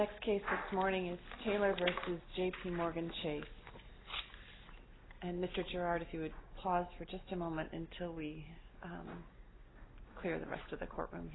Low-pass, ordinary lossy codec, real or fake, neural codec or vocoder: 7.2 kHz; AAC, 16 kbps; real; none